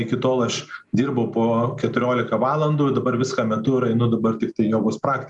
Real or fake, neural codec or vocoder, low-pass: real; none; 10.8 kHz